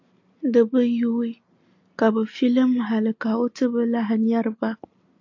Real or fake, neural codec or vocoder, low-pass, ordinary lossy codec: real; none; 7.2 kHz; AAC, 48 kbps